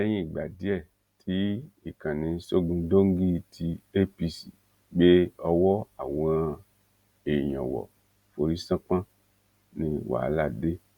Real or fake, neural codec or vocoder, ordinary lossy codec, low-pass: real; none; Opus, 64 kbps; 19.8 kHz